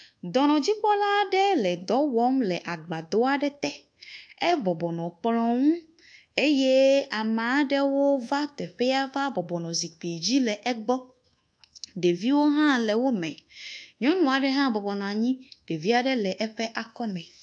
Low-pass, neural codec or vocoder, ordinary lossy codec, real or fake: 9.9 kHz; codec, 24 kHz, 1.2 kbps, DualCodec; AAC, 64 kbps; fake